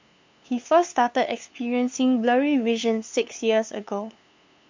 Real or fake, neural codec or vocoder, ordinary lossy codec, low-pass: fake; codec, 16 kHz, 2 kbps, FunCodec, trained on LibriTTS, 25 frames a second; MP3, 64 kbps; 7.2 kHz